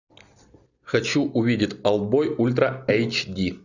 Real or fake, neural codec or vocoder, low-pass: real; none; 7.2 kHz